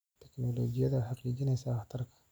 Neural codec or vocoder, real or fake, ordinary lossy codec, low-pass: none; real; none; none